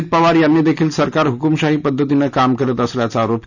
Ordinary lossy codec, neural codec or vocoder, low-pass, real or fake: none; none; 7.2 kHz; real